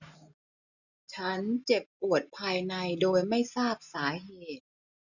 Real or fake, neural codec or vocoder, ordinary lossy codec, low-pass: real; none; none; 7.2 kHz